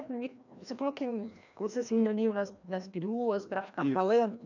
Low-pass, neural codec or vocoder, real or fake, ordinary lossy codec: 7.2 kHz; codec, 16 kHz, 1 kbps, FreqCodec, larger model; fake; none